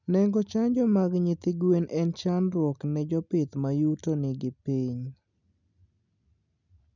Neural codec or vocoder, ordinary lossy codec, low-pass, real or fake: none; none; 7.2 kHz; real